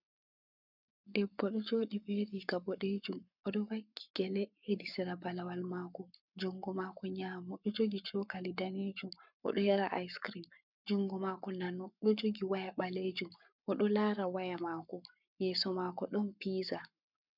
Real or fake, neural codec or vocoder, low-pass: fake; codec, 44.1 kHz, 7.8 kbps, Pupu-Codec; 5.4 kHz